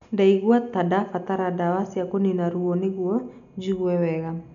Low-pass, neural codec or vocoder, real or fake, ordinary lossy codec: 7.2 kHz; none; real; none